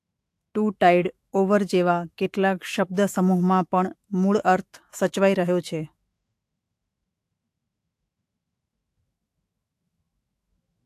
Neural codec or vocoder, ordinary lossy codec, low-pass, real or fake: autoencoder, 48 kHz, 128 numbers a frame, DAC-VAE, trained on Japanese speech; AAC, 64 kbps; 14.4 kHz; fake